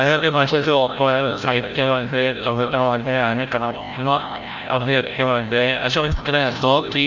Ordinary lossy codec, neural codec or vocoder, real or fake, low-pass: none; codec, 16 kHz, 0.5 kbps, FreqCodec, larger model; fake; 7.2 kHz